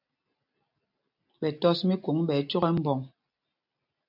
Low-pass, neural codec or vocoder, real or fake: 5.4 kHz; none; real